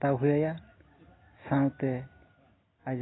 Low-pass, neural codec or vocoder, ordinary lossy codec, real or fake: 7.2 kHz; none; AAC, 16 kbps; real